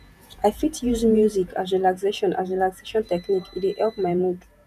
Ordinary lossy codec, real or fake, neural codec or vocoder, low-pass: none; fake; vocoder, 48 kHz, 128 mel bands, Vocos; 14.4 kHz